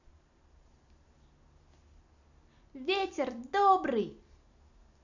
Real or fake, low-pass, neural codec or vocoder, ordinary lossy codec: real; 7.2 kHz; none; none